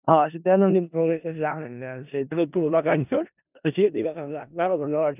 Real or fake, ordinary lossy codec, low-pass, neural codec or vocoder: fake; none; 3.6 kHz; codec, 16 kHz in and 24 kHz out, 0.4 kbps, LongCat-Audio-Codec, four codebook decoder